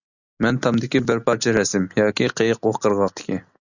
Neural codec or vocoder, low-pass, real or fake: none; 7.2 kHz; real